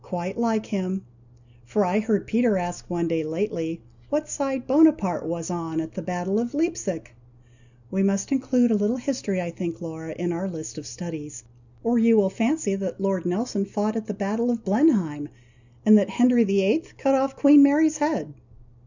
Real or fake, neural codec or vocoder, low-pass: real; none; 7.2 kHz